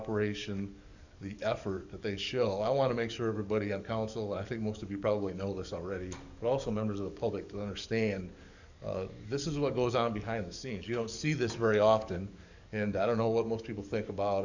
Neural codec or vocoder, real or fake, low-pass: codec, 44.1 kHz, 7.8 kbps, DAC; fake; 7.2 kHz